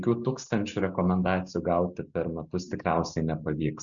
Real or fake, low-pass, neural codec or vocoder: real; 7.2 kHz; none